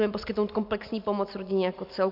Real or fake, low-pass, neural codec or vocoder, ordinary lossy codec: real; 5.4 kHz; none; MP3, 48 kbps